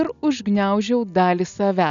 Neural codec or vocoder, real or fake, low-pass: none; real; 7.2 kHz